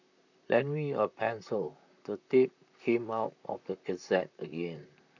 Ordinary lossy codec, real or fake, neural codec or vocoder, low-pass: none; fake; vocoder, 44.1 kHz, 128 mel bands, Pupu-Vocoder; 7.2 kHz